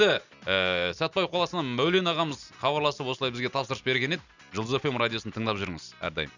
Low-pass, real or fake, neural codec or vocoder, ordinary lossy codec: 7.2 kHz; real; none; none